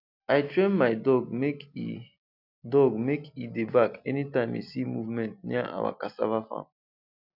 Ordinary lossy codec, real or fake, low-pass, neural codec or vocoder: none; real; 5.4 kHz; none